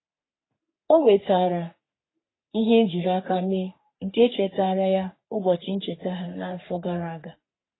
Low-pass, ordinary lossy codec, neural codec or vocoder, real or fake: 7.2 kHz; AAC, 16 kbps; codec, 44.1 kHz, 3.4 kbps, Pupu-Codec; fake